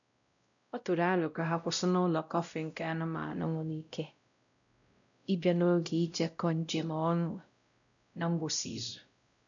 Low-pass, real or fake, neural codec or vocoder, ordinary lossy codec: 7.2 kHz; fake; codec, 16 kHz, 0.5 kbps, X-Codec, WavLM features, trained on Multilingual LibriSpeech; none